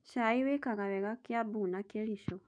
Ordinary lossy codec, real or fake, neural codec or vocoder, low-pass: none; fake; autoencoder, 48 kHz, 128 numbers a frame, DAC-VAE, trained on Japanese speech; 9.9 kHz